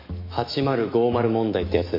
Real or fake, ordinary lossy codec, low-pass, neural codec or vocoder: fake; MP3, 32 kbps; 5.4 kHz; autoencoder, 48 kHz, 128 numbers a frame, DAC-VAE, trained on Japanese speech